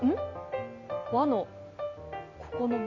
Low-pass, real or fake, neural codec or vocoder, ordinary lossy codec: 7.2 kHz; real; none; Opus, 64 kbps